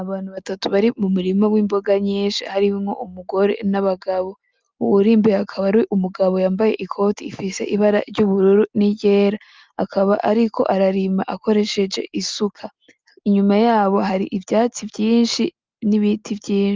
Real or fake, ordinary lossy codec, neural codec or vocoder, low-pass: real; Opus, 32 kbps; none; 7.2 kHz